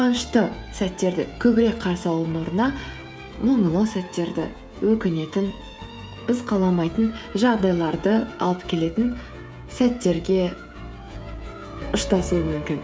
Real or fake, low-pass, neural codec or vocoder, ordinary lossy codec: fake; none; codec, 16 kHz, 16 kbps, FreqCodec, smaller model; none